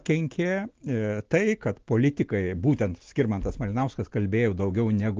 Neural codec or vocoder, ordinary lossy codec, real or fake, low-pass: none; Opus, 16 kbps; real; 7.2 kHz